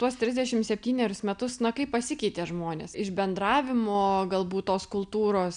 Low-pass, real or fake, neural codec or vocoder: 9.9 kHz; real; none